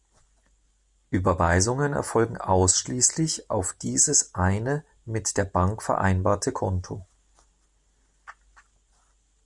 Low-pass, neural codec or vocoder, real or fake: 10.8 kHz; none; real